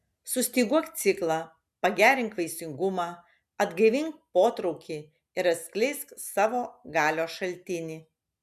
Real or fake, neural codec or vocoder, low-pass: real; none; 14.4 kHz